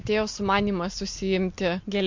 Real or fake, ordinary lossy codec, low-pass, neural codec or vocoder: real; MP3, 48 kbps; 7.2 kHz; none